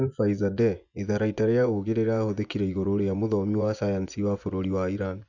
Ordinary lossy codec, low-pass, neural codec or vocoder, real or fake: none; 7.2 kHz; vocoder, 24 kHz, 100 mel bands, Vocos; fake